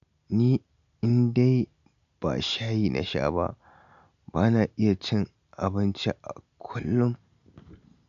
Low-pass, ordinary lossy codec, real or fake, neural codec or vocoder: 7.2 kHz; none; real; none